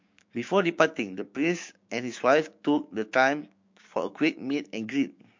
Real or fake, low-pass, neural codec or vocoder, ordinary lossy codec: fake; 7.2 kHz; codec, 16 kHz, 6 kbps, DAC; MP3, 48 kbps